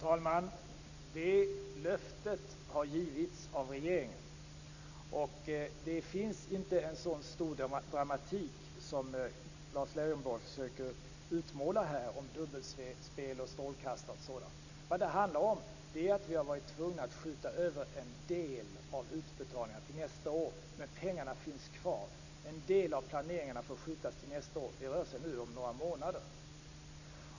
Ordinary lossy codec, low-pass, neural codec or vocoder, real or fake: none; 7.2 kHz; none; real